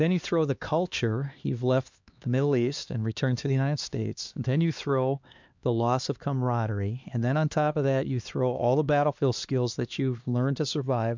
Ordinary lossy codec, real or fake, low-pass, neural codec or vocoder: MP3, 64 kbps; fake; 7.2 kHz; codec, 16 kHz, 2 kbps, X-Codec, HuBERT features, trained on LibriSpeech